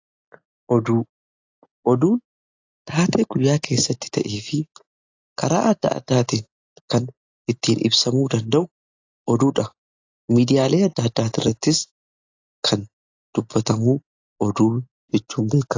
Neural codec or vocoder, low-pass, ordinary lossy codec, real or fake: none; 7.2 kHz; AAC, 48 kbps; real